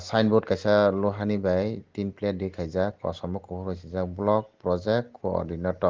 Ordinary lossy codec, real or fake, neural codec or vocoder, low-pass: Opus, 16 kbps; real; none; 7.2 kHz